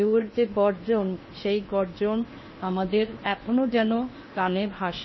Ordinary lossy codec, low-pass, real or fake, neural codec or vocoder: MP3, 24 kbps; 7.2 kHz; fake; codec, 16 kHz in and 24 kHz out, 0.8 kbps, FocalCodec, streaming, 65536 codes